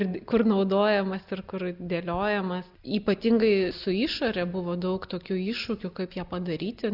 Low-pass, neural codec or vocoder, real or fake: 5.4 kHz; none; real